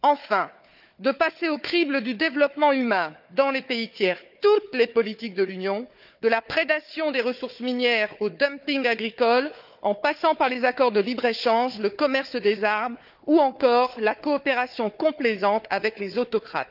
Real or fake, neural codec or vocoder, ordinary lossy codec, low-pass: fake; codec, 16 kHz, 4 kbps, FunCodec, trained on Chinese and English, 50 frames a second; none; 5.4 kHz